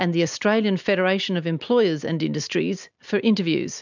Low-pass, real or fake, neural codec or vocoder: 7.2 kHz; real; none